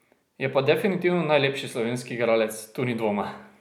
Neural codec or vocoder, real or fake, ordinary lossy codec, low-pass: none; real; none; 19.8 kHz